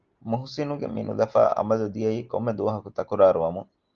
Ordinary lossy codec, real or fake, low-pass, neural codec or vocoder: Opus, 24 kbps; real; 7.2 kHz; none